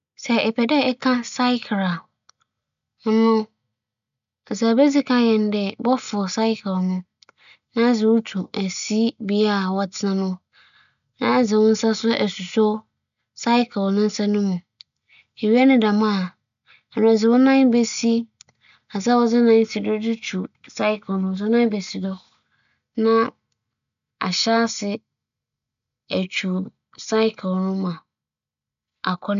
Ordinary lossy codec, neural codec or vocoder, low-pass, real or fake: none; none; 7.2 kHz; real